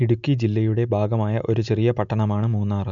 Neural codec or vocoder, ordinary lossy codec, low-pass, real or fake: none; none; 7.2 kHz; real